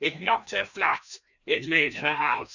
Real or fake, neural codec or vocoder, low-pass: fake; codec, 16 kHz, 1 kbps, FreqCodec, larger model; 7.2 kHz